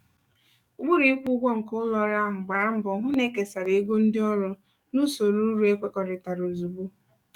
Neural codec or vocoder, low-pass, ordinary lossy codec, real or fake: codec, 44.1 kHz, 7.8 kbps, DAC; 19.8 kHz; none; fake